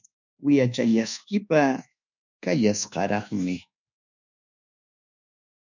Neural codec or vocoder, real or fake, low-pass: codec, 24 kHz, 1.2 kbps, DualCodec; fake; 7.2 kHz